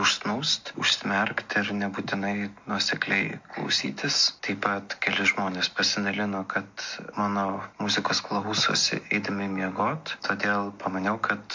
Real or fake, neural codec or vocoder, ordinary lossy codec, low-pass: real; none; MP3, 48 kbps; 7.2 kHz